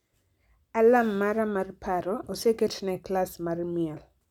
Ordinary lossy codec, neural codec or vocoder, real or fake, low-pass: none; none; real; 19.8 kHz